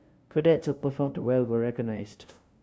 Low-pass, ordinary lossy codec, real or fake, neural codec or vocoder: none; none; fake; codec, 16 kHz, 0.5 kbps, FunCodec, trained on LibriTTS, 25 frames a second